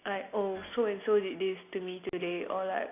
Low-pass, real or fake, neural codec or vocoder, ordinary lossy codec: 3.6 kHz; real; none; AAC, 24 kbps